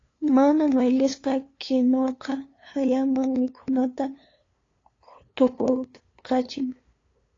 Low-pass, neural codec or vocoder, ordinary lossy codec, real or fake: 7.2 kHz; codec, 16 kHz, 2 kbps, FunCodec, trained on LibriTTS, 25 frames a second; AAC, 32 kbps; fake